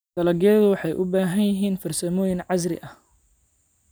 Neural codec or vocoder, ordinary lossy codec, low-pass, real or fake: none; none; none; real